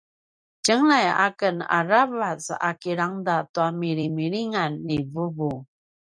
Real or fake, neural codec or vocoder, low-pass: fake; vocoder, 44.1 kHz, 128 mel bands every 256 samples, BigVGAN v2; 9.9 kHz